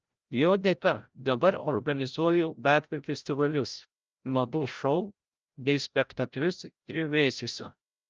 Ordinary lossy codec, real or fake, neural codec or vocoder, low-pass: Opus, 24 kbps; fake; codec, 16 kHz, 0.5 kbps, FreqCodec, larger model; 7.2 kHz